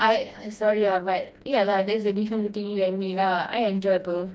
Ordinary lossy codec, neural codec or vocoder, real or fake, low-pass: none; codec, 16 kHz, 1 kbps, FreqCodec, smaller model; fake; none